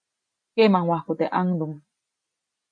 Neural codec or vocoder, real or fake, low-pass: none; real; 9.9 kHz